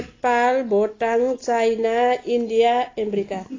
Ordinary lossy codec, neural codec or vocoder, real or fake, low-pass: AAC, 32 kbps; none; real; 7.2 kHz